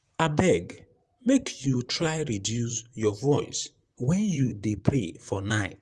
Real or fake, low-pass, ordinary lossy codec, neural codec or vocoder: fake; 10.8 kHz; Opus, 32 kbps; vocoder, 44.1 kHz, 128 mel bands, Pupu-Vocoder